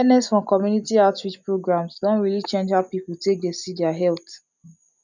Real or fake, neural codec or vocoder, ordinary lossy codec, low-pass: real; none; none; 7.2 kHz